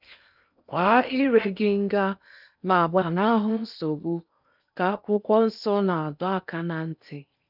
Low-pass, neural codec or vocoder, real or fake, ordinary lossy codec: 5.4 kHz; codec, 16 kHz in and 24 kHz out, 0.8 kbps, FocalCodec, streaming, 65536 codes; fake; none